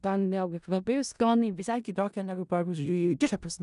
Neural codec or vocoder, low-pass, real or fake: codec, 16 kHz in and 24 kHz out, 0.4 kbps, LongCat-Audio-Codec, four codebook decoder; 10.8 kHz; fake